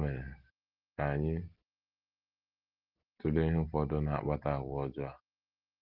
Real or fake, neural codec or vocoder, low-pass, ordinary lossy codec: real; none; 5.4 kHz; Opus, 16 kbps